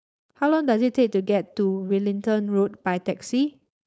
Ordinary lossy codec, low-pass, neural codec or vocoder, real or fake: none; none; codec, 16 kHz, 4.8 kbps, FACodec; fake